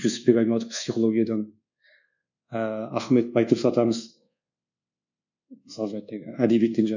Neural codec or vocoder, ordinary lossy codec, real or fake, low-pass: codec, 24 kHz, 1.2 kbps, DualCodec; none; fake; 7.2 kHz